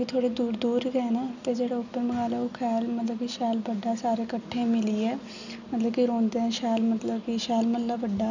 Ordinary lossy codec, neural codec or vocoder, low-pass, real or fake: none; none; 7.2 kHz; real